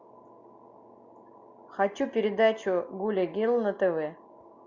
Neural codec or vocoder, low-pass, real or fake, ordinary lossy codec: none; 7.2 kHz; real; MP3, 64 kbps